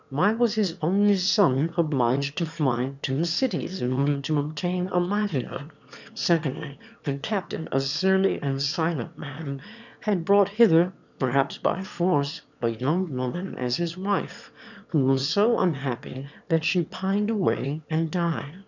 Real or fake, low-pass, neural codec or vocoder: fake; 7.2 kHz; autoencoder, 22.05 kHz, a latent of 192 numbers a frame, VITS, trained on one speaker